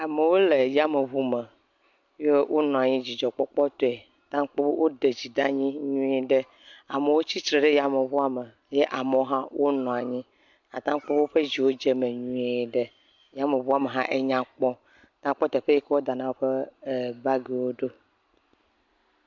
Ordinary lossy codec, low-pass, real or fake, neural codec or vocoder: MP3, 64 kbps; 7.2 kHz; real; none